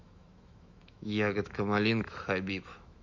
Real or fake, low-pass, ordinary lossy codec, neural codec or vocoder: fake; 7.2 kHz; none; autoencoder, 48 kHz, 128 numbers a frame, DAC-VAE, trained on Japanese speech